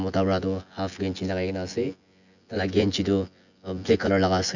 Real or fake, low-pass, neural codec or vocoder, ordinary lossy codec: fake; 7.2 kHz; vocoder, 24 kHz, 100 mel bands, Vocos; none